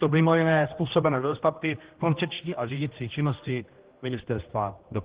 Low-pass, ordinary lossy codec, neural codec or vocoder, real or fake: 3.6 kHz; Opus, 16 kbps; codec, 16 kHz, 1 kbps, X-Codec, HuBERT features, trained on general audio; fake